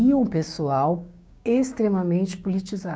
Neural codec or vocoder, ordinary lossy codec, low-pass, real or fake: codec, 16 kHz, 6 kbps, DAC; none; none; fake